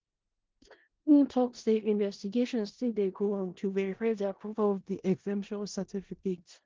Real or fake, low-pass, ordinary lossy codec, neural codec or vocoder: fake; 7.2 kHz; Opus, 16 kbps; codec, 16 kHz in and 24 kHz out, 0.4 kbps, LongCat-Audio-Codec, four codebook decoder